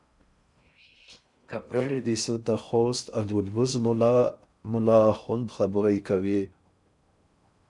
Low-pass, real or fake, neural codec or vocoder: 10.8 kHz; fake; codec, 16 kHz in and 24 kHz out, 0.6 kbps, FocalCodec, streaming, 4096 codes